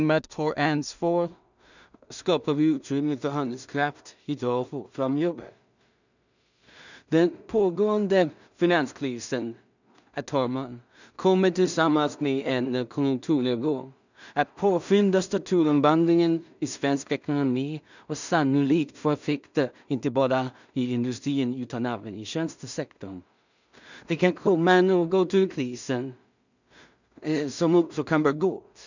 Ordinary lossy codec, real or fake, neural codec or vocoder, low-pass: none; fake; codec, 16 kHz in and 24 kHz out, 0.4 kbps, LongCat-Audio-Codec, two codebook decoder; 7.2 kHz